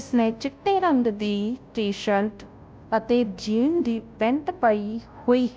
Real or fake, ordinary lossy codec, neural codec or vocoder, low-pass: fake; none; codec, 16 kHz, 0.5 kbps, FunCodec, trained on Chinese and English, 25 frames a second; none